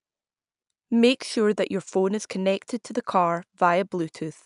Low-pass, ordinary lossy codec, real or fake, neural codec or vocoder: 10.8 kHz; none; real; none